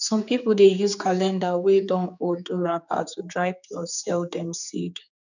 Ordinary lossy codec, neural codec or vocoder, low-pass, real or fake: none; codec, 16 kHz, 4 kbps, X-Codec, HuBERT features, trained on general audio; 7.2 kHz; fake